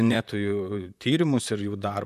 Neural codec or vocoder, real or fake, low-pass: vocoder, 44.1 kHz, 128 mel bands, Pupu-Vocoder; fake; 14.4 kHz